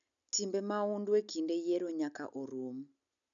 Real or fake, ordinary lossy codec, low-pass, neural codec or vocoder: real; none; 7.2 kHz; none